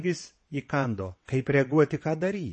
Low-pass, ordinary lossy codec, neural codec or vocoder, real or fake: 9.9 kHz; MP3, 32 kbps; vocoder, 22.05 kHz, 80 mel bands, WaveNeXt; fake